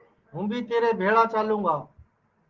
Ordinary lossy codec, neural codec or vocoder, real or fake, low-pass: Opus, 16 kbps; none; real; 7.2 kHz